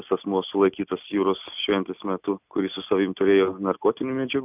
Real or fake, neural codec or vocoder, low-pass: real; none; 3.6 kHz